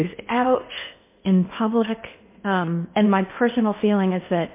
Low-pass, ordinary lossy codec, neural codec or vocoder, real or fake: 3.6 kHz; MP3, 24 kbps; codec, 16 kHz in and 24 kHz out, 0.8 kbps, FocalCodec, streaming, 65536 codes; fake